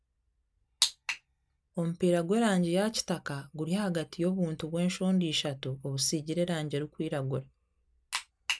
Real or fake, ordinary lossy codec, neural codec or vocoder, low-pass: real; none; none; none